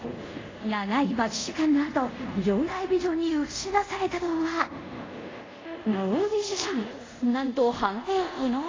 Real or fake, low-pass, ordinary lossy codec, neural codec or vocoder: fake; 7.2 kHz; AAC, 32 kbps; codec, 24 kHz, 0.5 kbps, DualCodec